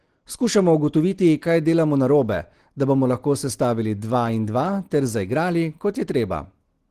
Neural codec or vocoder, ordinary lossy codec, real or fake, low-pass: none; Opus, 16 kbps; real; 14.4 kHz